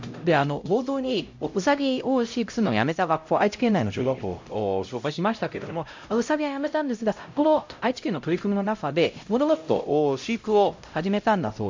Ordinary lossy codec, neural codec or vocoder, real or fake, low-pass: MP3, 48 kbps; codec, 16 kHz, 0.5 kbps, X-Codec, HuBERT features, trained on LibriSpeech; fake; 7.2 kHz